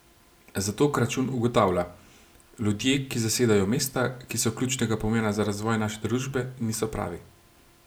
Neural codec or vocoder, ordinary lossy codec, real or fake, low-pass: none; none; real; none